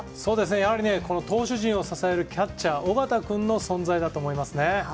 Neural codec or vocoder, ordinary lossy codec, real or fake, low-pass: none; none; real; none